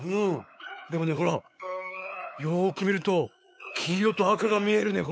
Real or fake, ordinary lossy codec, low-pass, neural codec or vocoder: fake; none; none; codec, 16 kHz, 4 kbps, X-Codec, WavLM features, trained on Multilingual LibriSpeech